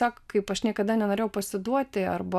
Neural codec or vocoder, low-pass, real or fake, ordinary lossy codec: none; 14.4 kHz; real; AAC, 96 kbps